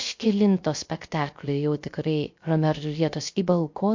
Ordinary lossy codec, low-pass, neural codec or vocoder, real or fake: MP3, 48 kbps; 7.2 kHz; codec, 16 kHz, 0.3 kbps, FocalCodec; fake